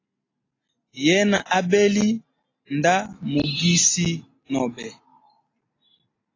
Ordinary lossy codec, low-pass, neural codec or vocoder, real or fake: AAC, 32 kbps; 7.2 kHz; none; real